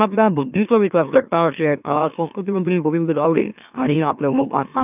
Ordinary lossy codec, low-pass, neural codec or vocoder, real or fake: none; 3.6 kHz; autoencoder, 44.1 kHz, a latent of 192 numbers a frame, MeloTTS; fake